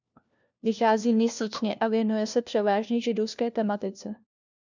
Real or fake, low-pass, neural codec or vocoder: fake; 7.2 kHz; codec, 16 kHz, 1 kbps, FunCodec, trained on LibriTTS, 50 frames a second